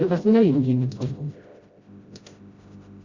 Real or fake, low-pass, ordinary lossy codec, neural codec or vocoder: fake; 7.2 kHz; Opus, 64 kbps; codec, 16 kHz, 0.5 kbps, FreqCodec, smaller model